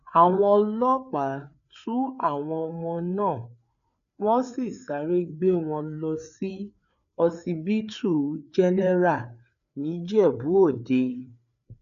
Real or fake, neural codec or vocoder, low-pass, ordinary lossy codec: fake; codec, 16 kHz, 4 kbps, FreqCodec, larger model; 7.2 kHz; none